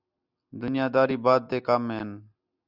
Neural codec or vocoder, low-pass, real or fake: none; 5.4 kHz; real